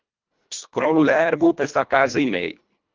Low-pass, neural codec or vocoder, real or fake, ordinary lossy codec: 7.2 kHz; codec, 24 kHz, 1.5 kbps, HILCodec; fake; Opus, 24 kbps